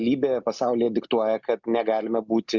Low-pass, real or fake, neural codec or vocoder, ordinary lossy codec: 7.2 kHz; real; none; Opus, 64 kbps